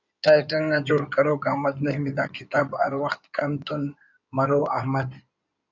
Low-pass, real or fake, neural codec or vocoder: 7.2 kHz; fake; codec, 16 kHz in and 24 kHz out, 2.2 kbps, FireRedTTS-2 codec